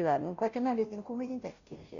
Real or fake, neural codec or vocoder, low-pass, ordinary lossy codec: fake; codec, 16 kHz, 0.5 kbps, FunCodec, trained on Chinese and English, 25 frames a second; 7.2 kHz; none